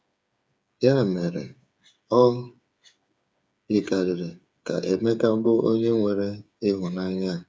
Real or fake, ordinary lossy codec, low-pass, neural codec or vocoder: fake; none; none; codec, 16 kHz, 8 kbps, FreqCodec, smaller model